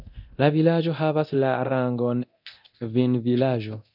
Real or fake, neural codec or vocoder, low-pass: fake; codec, 24 kHz, 0.9 kbps, DualCodec; 5.4 kHz